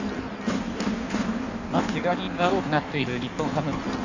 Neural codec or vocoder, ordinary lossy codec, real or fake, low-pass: codec, 16 kHz in and 24 kHz out, 1.1 kbps, FireRedTTS-2 codec; none; fake; 7.2 kHz